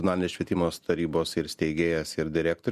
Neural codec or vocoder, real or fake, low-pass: none; real; 14.4 kHz